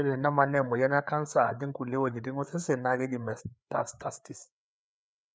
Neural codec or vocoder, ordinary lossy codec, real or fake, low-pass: codec, 16 kHz, 4 kbps, FreqCodec, larger model; none; fake; none